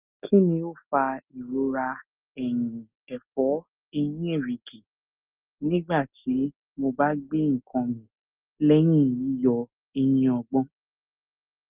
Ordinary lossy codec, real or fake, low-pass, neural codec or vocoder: Opus, 24 kbps; real; 3.6 kHz; none